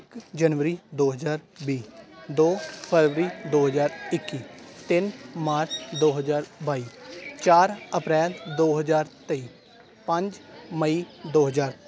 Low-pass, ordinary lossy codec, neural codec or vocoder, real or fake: none; none; none; real